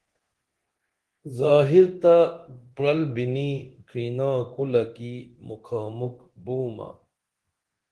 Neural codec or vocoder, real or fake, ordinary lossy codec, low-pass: codec, 24 kHz, 0.9 kbps, DualCodec; fake; Opus, 16 kbps; 10.8 kHz